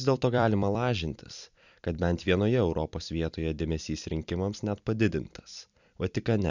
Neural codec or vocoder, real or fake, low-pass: vocoder, 44.1 kHz, 128 mel bands every 256 samples, BigVGAN v2; fake; 7.2 kHz